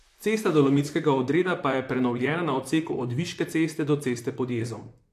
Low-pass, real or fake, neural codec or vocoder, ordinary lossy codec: 14.4 kHz; fake; vocoder, 44.1 kHz, 128 mel bands, Pupu-Vocoder; AAC, 64 kbps